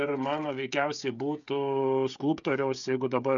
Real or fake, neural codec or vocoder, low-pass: fake; codec, 16 kHz, 16 kbps, FreqCodec, smaller model; 7.2 kHz